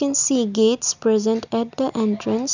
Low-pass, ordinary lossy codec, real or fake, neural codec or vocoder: 7.2 kHz; none; real; none